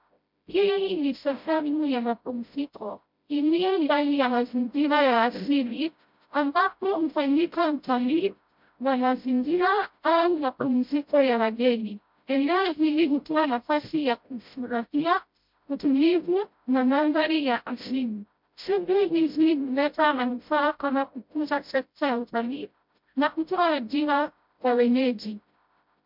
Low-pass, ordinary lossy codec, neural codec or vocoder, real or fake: 5.4 kHz; MP3, 48 kbps; codec, 16 kHz, 0.5 kbps, FreqCodec, smaller model; fake